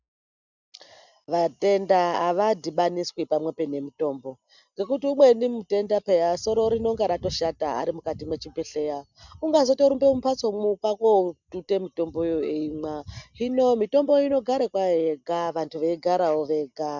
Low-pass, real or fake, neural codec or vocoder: 7.2 kHz; real; none